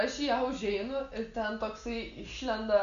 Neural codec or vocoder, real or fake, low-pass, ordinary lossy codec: none; real; 7.2 kHz; Opus, 64 kbps